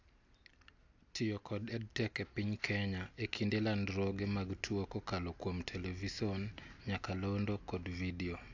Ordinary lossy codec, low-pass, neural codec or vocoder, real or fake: AAC, 48 kbps; 7.2 kHz; none; real